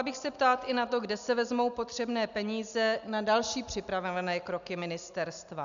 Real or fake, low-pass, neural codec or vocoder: real; 7.2 kHz; none